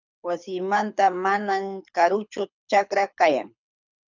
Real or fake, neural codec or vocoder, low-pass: fake; codec, 24 kHz, 6 kbps, HILCodec; 7.2 kHz